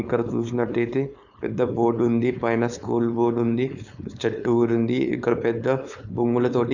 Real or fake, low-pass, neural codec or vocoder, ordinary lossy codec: fake; 7.2 kHz; codec, 16 kHz, 4.8 kbps, FACodec; none